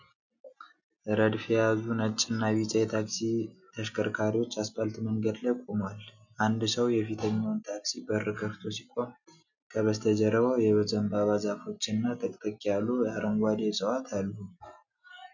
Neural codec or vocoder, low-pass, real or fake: none; 7.2 kHz; real